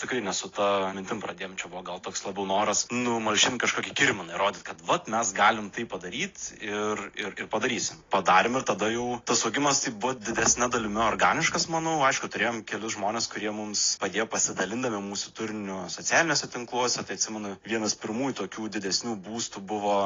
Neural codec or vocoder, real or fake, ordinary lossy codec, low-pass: none; real; AAC, 32 kbps; 7.2 kHz